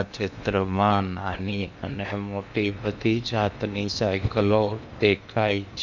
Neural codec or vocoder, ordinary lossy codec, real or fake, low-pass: codec, 16 kHz in and 24 kHz out, 0.8 kbps, FocalCodec, streaming, 65536 codes; none; fake; 7.2 kHz